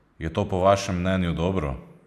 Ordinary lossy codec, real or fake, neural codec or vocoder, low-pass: none; real; none; 14.4 kHz